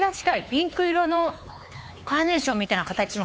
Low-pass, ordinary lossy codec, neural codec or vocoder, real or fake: none; none; codec, 16 kHz, 4 kbps, X-Codec, HuBERT features, trained on LibriSpeech; fake